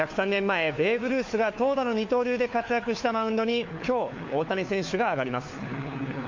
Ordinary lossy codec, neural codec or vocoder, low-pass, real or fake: MP3, 48 kbps; codec, 16 kHz, 4 kbps, FunCodec, trained on LibriTTS, 50 frames a second; 7.2 kHz; fake